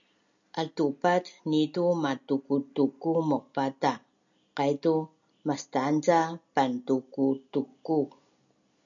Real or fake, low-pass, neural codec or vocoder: real; 7.2 kHz; none